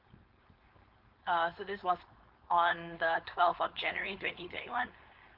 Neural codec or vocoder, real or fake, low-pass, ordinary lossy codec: codec, 16 kHz, 4.8 kbps, FACodec; fake; 5.4 kHz; Opus, 32 kbps